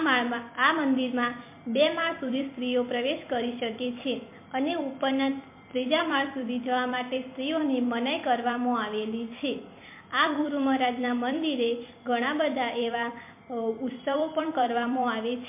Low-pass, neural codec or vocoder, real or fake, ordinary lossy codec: 3.6 kHz; vocoder, 44.1 kHz, 128 mel bands every 256 samples, BigVGAN v2; fake; MP3, 24 kbps